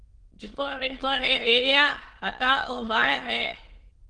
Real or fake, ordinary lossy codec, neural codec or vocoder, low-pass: fake; Opus, 16 kbps; autoencoder, 22.05 kHz, a latent of 192 numbers a frame, VITS, trained on many speakers; 9.9 kHz